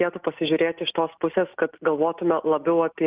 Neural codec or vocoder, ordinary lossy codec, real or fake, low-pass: none; Opus, 32 kbps; real; 3.6 kHz